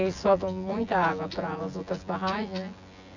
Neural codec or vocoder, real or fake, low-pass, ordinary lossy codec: vocoder, 24 kHz, 100 mel bands, Vocos; fake; 7.2 kHz; none